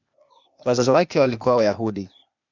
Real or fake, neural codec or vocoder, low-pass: fake; codec, 16 kHz, 0.8 kbps, ZipCodec; 7.2 kHz